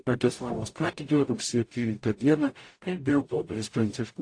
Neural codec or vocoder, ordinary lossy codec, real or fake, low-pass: codec, 44.1 kHz, 0.9 kbps, DAC; AAC, 48 kbps; fake; 9.9 kHz